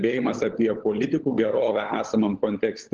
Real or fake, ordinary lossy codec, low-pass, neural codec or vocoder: fake; Opus, 16 kbps; 7.2 kHz; codec, 16 kHz, 16 kbps, FunCodec, trained on LibriTTS, 50 frames a second